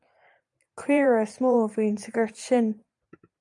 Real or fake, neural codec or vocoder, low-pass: fake; vocoder, 44.1 kHz, 128 mel bands every 512 samples, BigVGAN v2; 10.8 kHz